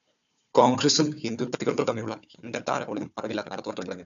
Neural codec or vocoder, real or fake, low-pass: codec, 16 kHz, 4 kbps, FunCodec, trained on Chinese and English, 50 frames a second; fake; 7.2 kHz